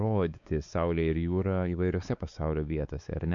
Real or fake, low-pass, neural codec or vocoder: fake; 7.2 kHz; codec, 16 kHz, 4 kbps, X-Codec, WavLM features, trained on Multilingual LibriSpeech